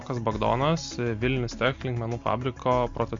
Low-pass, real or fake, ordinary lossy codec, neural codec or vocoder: 7.2 kHz; real; MP3, 48 kbps; none